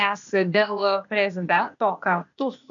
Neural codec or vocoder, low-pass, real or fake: codec, 16 kHz, 0.8 kbps, ZipCodec; 7.2 kHz; fake